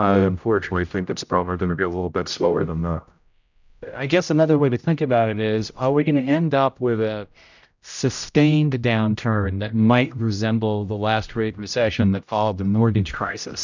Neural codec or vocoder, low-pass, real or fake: codec, 16 kHz, 0.5 kbps, X-Codec, HuBERT features, trained on general audio; 7.2 kHz; fake